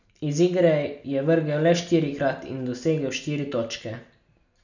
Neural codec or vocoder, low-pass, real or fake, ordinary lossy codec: none; 7.2 kHz; real; none